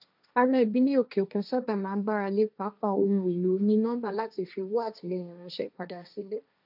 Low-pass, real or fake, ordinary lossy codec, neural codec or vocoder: 5.4 kHz; fake; none; codec, 16 kHz, 1.1 kbps, Voila-Tokenizer